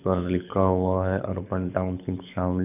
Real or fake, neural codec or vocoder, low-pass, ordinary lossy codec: fake; codec, 24 kHz, 6 kbps, HILCodec; 3.6 kHz; none